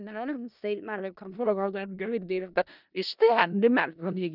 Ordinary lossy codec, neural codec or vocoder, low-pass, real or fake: none; codec, 16 kHz in and 24 kHz out, 0.4 kbps, LongCat-Audio-Codec, four codebook decoder; 5.4 kHz; fake